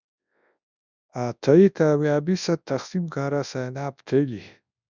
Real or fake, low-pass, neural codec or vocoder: fake; 7.2 kHz; codec, 24 kHz, 0.9 kbps, WavTokenizer, large speech release